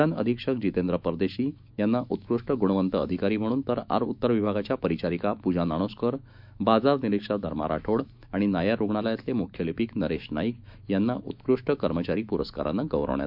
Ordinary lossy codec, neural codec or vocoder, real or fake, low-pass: none; autoencoder, 48 kHz, 128 numbers a frame, DAC-VAE, trained on Japanese speech; fake; 5.4 kHz